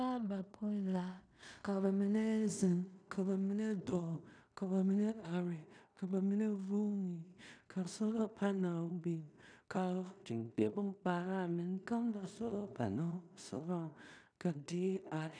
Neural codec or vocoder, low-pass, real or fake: codec, 16 kHz in and 24 kHz out, 0.4 kbps, LongCat-Audio-Codec, two codebook decoder; 9.9 kHz; fake